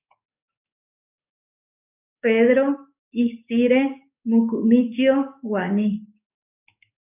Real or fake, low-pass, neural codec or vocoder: fake; 3.6 kHz; codec, 44.1 kHz, 7.8 kbps, DAC